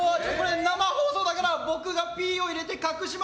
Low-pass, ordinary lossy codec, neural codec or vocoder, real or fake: none; none; none; real